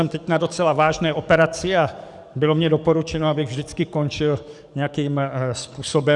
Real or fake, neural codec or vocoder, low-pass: fake; codec, 44.1 kHz, 7.8 kbps, DAC; 10.8 kHz